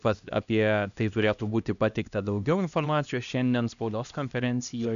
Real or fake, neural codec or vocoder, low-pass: fake; codec, 16 kHz, 1 kbps, X-Codec, HuBERT features, trained on LibriSpeech; 7.2 kHz